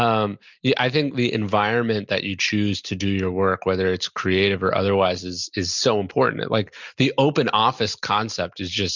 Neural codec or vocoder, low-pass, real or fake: none; 7.2 kHz; real